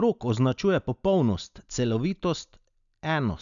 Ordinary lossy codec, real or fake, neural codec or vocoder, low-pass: none; real; none; 7.2 kHz